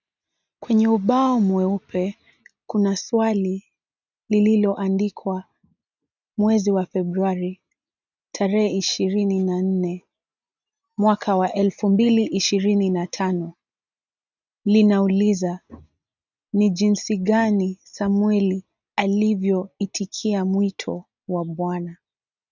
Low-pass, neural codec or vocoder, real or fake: 7.2 kHz; none; real